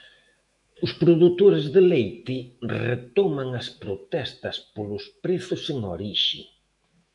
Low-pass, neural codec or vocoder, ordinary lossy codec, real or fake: 10.8 kHz; autoencoder, 48 kHz, 128 numbers a frame, DAC-VAE, trained on Japanese speech; MP3, 96 kbps; fake